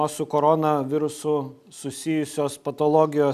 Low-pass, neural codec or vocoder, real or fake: 14.4 kHz; none; real